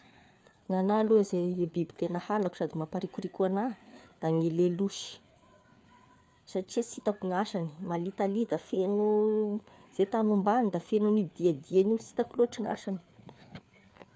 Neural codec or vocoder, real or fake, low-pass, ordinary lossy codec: codec, 16 kHz, 4 kbps, FreqCodec, larger model; fake; none; none